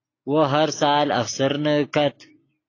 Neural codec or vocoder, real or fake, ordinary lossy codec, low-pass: none; real; AAC, 32 kbps; 7.2 kHz